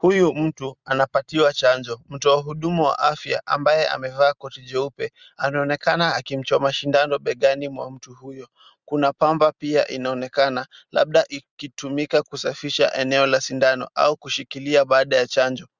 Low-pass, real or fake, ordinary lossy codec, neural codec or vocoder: 7.2 kHz; real; Opus, 64 kbps; none